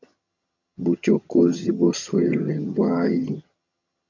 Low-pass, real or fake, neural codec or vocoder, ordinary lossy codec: 7.2 kHz; fake; vocoder, 22.05 kHz, 80 mel bands, HiFi-GAN; MP3, 48 kbps